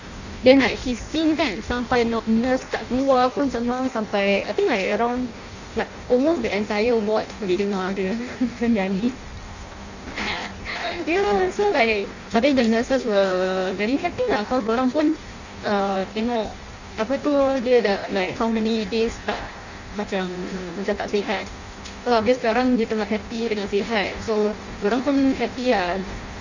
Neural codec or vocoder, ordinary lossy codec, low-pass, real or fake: codec, 16 kHz in and 24 kHz out, 0.6 kbps, FireRedTTS-2 codec; none; 7.2 kHz; fake